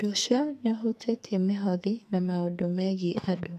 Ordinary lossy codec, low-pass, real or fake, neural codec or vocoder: none; 14.4 kHz; fake; codec, 44.1 kHz, 2.6 kbps, SNAC